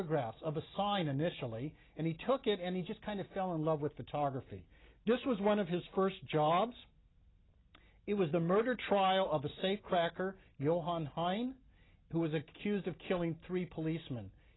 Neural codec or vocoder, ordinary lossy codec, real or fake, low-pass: none; AAC, 16 kbps; real; 7.2 kHz